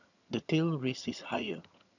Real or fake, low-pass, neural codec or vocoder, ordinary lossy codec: fake; 7.2 kHz; vocoder, 22.05 kHz, 80 mel bands, HiFi-GAN; none